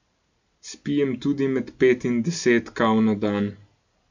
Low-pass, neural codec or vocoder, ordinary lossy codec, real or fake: 7.2 kHz; none; none; real